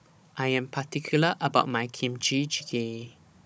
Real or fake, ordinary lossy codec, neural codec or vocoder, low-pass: fake; none; codec, 16 kHz, 16 kbps, FunCodec, trained on Chinese and English, 50 frames a second; none